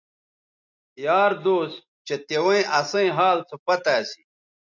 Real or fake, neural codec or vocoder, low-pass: real; none; 7.2 kHz